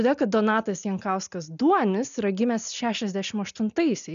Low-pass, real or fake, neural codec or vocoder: 7.2 kHz; real; none